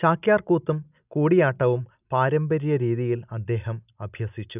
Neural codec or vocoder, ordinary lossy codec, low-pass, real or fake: none; none; 3.6 kHz; real